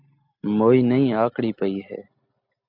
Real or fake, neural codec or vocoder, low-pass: real; none; 5.4 kHz